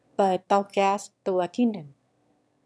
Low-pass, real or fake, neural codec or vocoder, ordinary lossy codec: none; fake; autoencoder, 22.05 kHz, a latent of 192 numbers a frame, VITS, trained on one speaker; none